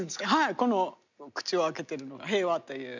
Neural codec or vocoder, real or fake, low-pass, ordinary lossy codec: none; real; 7.2 kHz; none